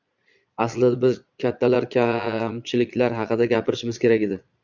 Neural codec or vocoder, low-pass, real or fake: vocoder, 22.05 kHz, 80 mel bands, Vocos; 7.2 kHz; fake